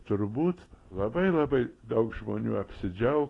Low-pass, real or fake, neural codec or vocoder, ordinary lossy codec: 10.8 kHz; real; none; AAC, 32 kbps